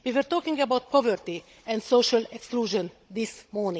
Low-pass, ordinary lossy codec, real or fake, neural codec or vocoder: none; none; fake; codec, 16 kHz, 16 kbps, FunCodec, trained on Chinese and English, 50 frames a second